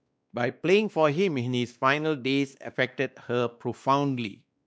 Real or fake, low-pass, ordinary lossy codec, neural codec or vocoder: fake; none; none; codec, 16 kHz, 2 kbps, X-Codec, WavLM features, trained on Multilingual LibriSpeech